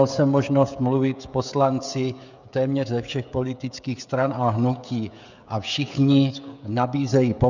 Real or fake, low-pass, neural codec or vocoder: fake; 7.2 kHz; codec, 16 kHz, 16 kbps, FreqCodec, smaller model